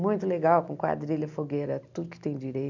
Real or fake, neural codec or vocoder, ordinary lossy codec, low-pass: real; none; none; 7.2 kHz